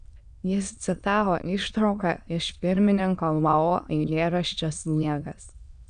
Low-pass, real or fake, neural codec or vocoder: 9.9 kHz; fake; autoencoder, 22.05 kHz, a latent of 192 numbers a frame, VITS, trained on many speakers